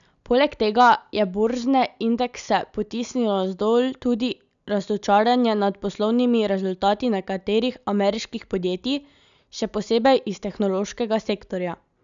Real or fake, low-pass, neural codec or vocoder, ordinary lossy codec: real; 7.2 kHz; none; none